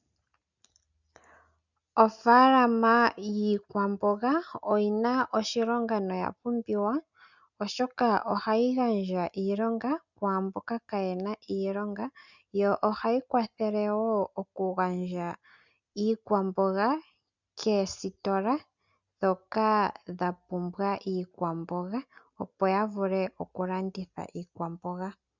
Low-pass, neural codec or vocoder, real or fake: 7.2 kHz; none; real